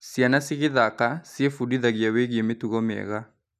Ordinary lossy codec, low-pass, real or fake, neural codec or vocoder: none; 14.4 kHz; real; none